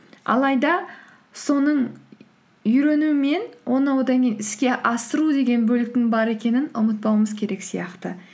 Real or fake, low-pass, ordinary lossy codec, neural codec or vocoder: real; none; none; none